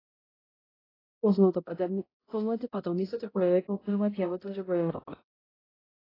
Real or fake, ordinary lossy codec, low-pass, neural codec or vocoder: fake; AAC, 24 kbps; 5.4 kHz; codec, 16 kHz, 0.5 kbps, X-Codec, HuBERT features, trained on balanced general audio